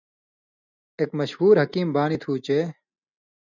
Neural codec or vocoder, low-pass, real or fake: none; 7.2 kHz; real